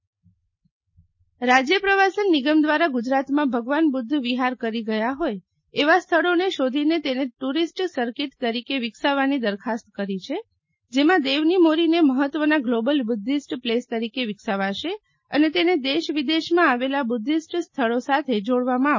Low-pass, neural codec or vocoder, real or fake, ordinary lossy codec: 7.2 kHz; none; real; MP3, 32 kbps